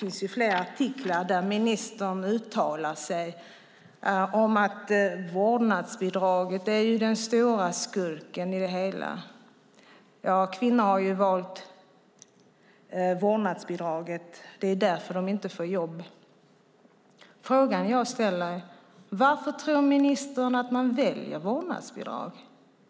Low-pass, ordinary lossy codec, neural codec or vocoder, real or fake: none; none; none; real